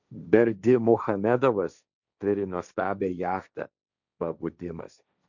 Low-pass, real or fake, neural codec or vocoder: 7.2 kHz; fake; codec, 16 kHz, 1.1 kbps, Voila-Tokenizer